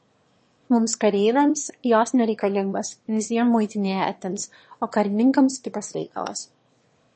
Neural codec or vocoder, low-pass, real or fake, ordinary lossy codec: autoencoder, 22.05 kHz, a latent of 192 numbers a frame, VITS, trained on one speaker; 9.9 kHz; fake; MP3, 32 kbps